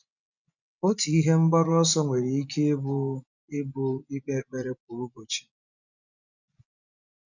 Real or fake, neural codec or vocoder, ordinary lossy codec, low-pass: fake; vocoder, 24 kHz, 100 mel bands, Vocos; none; 7.2 kHz